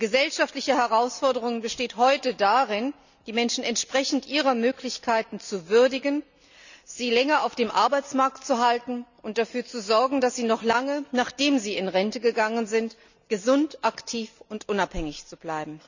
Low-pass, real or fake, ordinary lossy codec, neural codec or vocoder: 7.2 kHz; real; none; none